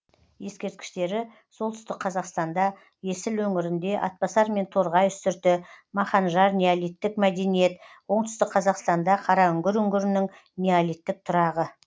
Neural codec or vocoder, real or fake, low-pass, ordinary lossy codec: none; real; none; none